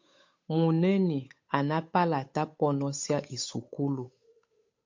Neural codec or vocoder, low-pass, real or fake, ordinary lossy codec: codec, 16 kHz, 8 kbps, FunCodec, trained on Chinese and English, 25 frames a second; 7.2 kHz; fake; MP3, 48 kbps